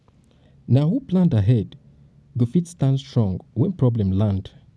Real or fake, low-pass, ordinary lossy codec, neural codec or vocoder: real; none; none; none